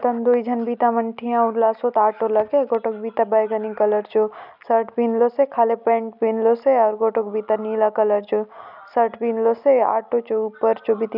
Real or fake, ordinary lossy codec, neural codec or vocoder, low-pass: real; none; none; 5.4 kHz